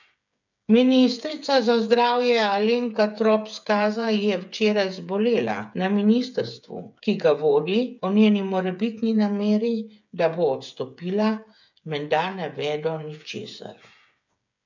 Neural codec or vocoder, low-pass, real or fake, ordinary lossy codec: codec, 16 kHz, 8 kbps, FreqCodec, smaller model; 7.2 kHz; fake; none